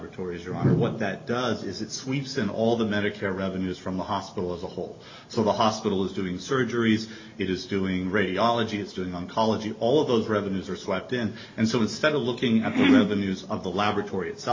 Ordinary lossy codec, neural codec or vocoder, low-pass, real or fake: MP3, 32 kbps; none; 7.2 kHz; real